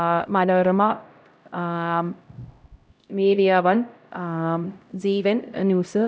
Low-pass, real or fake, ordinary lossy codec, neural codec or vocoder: none; fake; none; codec, 16 kHz, 0.5 kbps, X-Codec, HuBERT features, trained on LibriSpeech